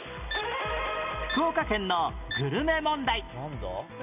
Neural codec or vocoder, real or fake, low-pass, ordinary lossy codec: none; real; 3.6 kHz; none